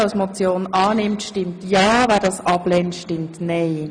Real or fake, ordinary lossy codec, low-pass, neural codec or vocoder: real; none; 9.9 kHz; none